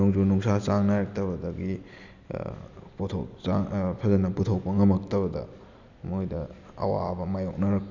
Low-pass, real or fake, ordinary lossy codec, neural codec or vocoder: 7.2 kHz; real; none; none